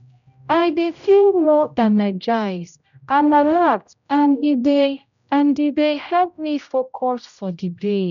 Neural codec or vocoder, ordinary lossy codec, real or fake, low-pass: codec, 16 kHz, 0.5 kbps, X-Codec, HuBERT features, trained on general audio; none; fake; 7.2 kHz